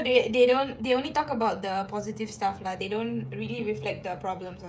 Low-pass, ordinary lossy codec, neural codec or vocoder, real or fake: none; none; codec, 16 kHz, 16 kbps, FreqCodec, smaller model; fake